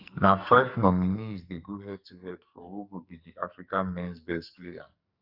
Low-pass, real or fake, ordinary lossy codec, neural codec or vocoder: 5.4 kHz; fake; Opus, 64 kbps; codec, 44.1 kHz, 2.6 kbps, SNAC